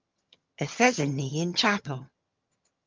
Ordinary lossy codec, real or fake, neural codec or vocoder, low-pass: Opus, 24 kbps; fake; vocoder, 22.05 kHz, 80 mel bands, HiFi-GAN; 7.2 kHz